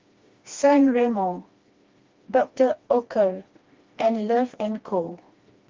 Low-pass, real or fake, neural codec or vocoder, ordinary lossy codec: 7.2 kHz; fake; codec, 16 kHz, 2 kbps, FreqCodec, smaller model; Opus, 32 kbps